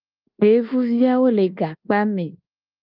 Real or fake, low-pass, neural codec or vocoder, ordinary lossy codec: fake; 5.4 kHz; codec, 24 kHz, 1.2 kbps, DualCodec; Opus, 24 kbps